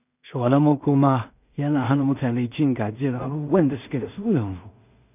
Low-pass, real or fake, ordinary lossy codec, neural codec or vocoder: 3.6 kHz; fake; none; codec, 16 kHz in and 24 kHz out, 0.4 kbps, LongCat-Audio-Codec, two codebook decoder